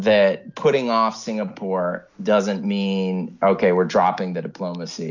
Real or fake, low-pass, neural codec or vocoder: real; 7.2 kHz; none